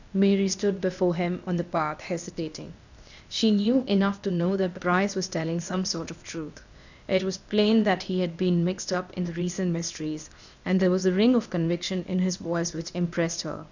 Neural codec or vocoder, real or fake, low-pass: codec, 16 kHz, 0.8 kbps, ZipCodec; fake; 7.2 kHz